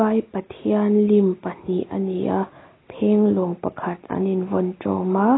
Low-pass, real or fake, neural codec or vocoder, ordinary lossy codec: 7.2 kHz; real; none; AAC, 16 kbps